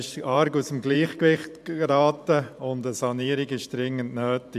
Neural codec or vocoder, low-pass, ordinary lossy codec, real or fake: vocoder, 44.1 kHz, 128 mel bands every 512 samples, BigVGAN v2; 14.4 kHz; none; fake